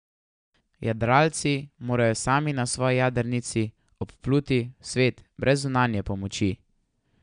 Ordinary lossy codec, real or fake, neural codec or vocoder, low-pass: MP3, 96 kbps; real; none; 9.9 kHz